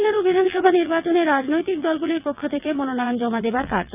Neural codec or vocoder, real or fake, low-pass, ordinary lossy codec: vocoder, 22.05 kHz, 80 mel bands, WaveNeXt; fake; 3.6 kHz; none